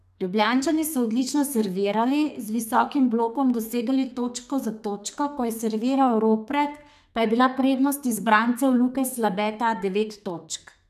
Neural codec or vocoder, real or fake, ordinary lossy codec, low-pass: codec, 32 kHz, 1.9 kbps, SNAC; fake; none; 14.4 kHz